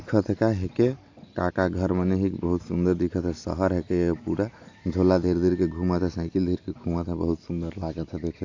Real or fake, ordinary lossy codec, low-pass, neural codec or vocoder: real; AAC, 48 kbps; 7.2 kHz; none